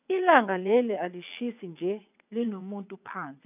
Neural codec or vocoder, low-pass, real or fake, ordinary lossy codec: vocoder, 22.05 kHz, 80 mel bands, WaveNeXt; 3.6 kHz; fake; none